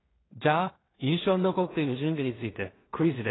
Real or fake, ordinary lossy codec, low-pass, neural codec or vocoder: fake; AAC, 16 kbps; 7.2 kHz; codec, 16 kHz in and 24 kHz out, 0.4 kbps, LongCat-Audio-Codec, two codebook decoder